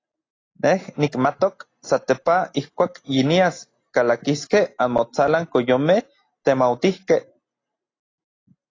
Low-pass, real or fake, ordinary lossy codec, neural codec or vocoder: 7.2 kHz; real; AAC, 32 kbps; none